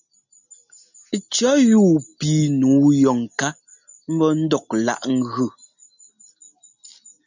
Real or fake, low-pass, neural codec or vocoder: real; 7.2 kHz; none